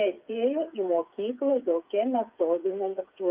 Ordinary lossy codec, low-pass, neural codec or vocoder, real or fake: Opus, 32 kbps; 3.6 kHz; codec, 16 kHz, 8 kbps, FreqCodec, smaller model; fake